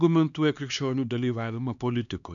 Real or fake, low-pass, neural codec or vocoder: fake; 7.2 kHz; codec, 16 kHz, 2 kbps, X-Codec, HuBERT features, trained on LibriSpeech